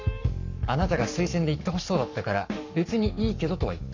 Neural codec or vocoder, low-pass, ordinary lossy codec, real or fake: codec, 44.1 kHz, 7.8 kbps, Pupu-Codec; 7.2 kHz; none; fake